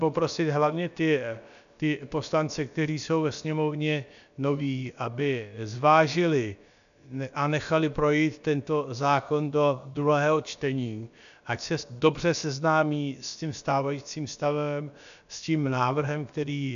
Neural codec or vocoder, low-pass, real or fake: codec, 16 kHz, about 1 kbps, DyCAST, with the encoder's durations; 7.2 kHz; fake